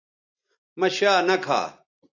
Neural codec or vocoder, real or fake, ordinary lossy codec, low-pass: none; real; AAC, 48 kbps; 7.2 kHz